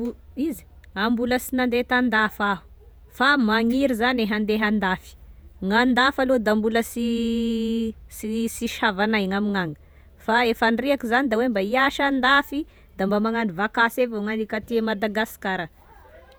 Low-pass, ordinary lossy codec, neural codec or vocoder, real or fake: none; none; vocoder, 48 kHz, 128 mel bands, Vocos; fake